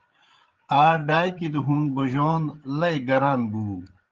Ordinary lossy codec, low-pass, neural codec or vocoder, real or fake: Opus, 16 kbps; 7.2 kHz; codec, 16 kHz, 8 kbps, FreqCodec, larger model; fake